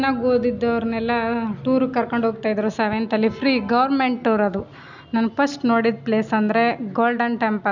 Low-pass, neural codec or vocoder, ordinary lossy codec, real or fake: 7.2 kHz; none; none; real